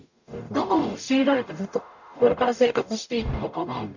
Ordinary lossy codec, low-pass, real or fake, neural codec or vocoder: none; 7.2 kHz; fake; codec, 44.1 kHz, 0.9 kbps, DAC